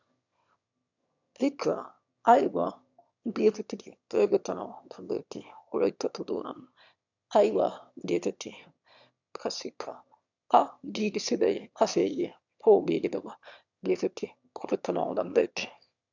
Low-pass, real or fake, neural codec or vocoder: 7.2 kHz; fake; autoencoder, 22.05 kHz, a latent of 192 numbers a frame, VITS, trained on one speaker